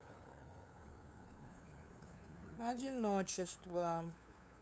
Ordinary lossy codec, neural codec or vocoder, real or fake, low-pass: none; codec, 16 kHz, 2 kbps, FunCodec, trained on LibriTTS, 25 frames a second; fake; none